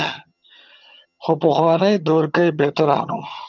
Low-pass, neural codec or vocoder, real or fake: 7.2 kHz; vocoder, 22.05 kHz, 80 mel bands, HiFi-GAN; fake